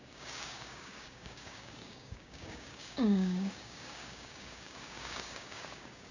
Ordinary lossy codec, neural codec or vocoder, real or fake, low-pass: none; codec, 16 kHz, 6 kbps, DAC; fake; 7.2 kHz